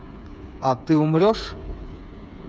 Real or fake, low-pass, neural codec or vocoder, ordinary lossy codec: fake; none; codec, 16 kHz, 8 kbps, FreqCodec, smaller model; none